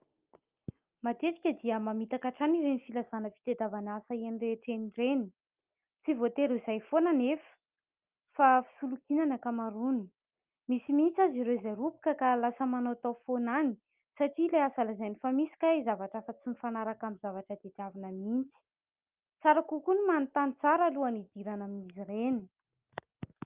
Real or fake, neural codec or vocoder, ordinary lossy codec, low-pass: real; none; Opus, 24 kbps; 3.6 kHz